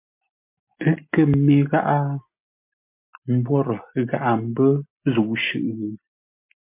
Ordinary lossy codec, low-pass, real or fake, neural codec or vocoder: MP3, 32 kbps; 3.6 kHz; real; none